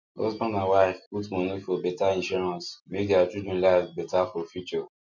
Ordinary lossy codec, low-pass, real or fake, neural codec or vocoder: MP3, 64 kbps; 7.2 kHz; real; none